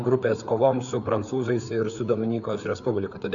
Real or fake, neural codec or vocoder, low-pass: fake; codec, 16 kHz, 4 kbps, FreqCodec, larger model; 7.2 kHz